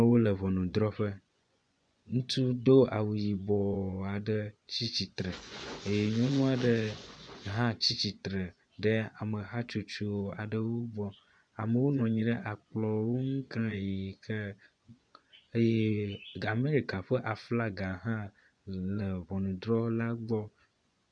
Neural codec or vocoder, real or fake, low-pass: vocoder, 24 kHz, 100 mel bands, Vocos; fake; 9.9 kHz